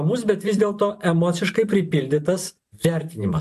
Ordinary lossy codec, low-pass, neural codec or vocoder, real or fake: AAC, 96 kbps; 14.4 kHz; vocoder, 44.1 kHz, 128 mel bands every 512 samples, BigVGAN v2; fake